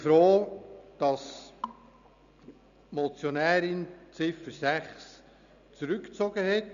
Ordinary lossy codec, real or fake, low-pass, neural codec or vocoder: none; real; 7.2 kHz; none